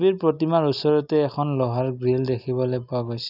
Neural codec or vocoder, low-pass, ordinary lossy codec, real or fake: none; 5.4 kHz; none; real